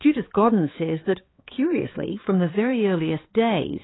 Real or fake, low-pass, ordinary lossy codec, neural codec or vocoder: fake; 7.2 kHz; AAC, 16 kbps; codec, 16 kHz, 4 kbps, X-Codec, HuBERT features, trained on balanced general audio